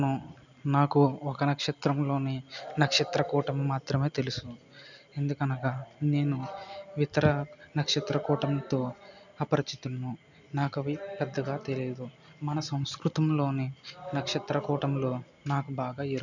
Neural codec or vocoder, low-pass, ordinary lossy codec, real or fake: none; 7.2 kHz; none; real